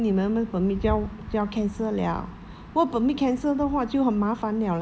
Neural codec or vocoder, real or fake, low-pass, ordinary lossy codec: none; real; none; none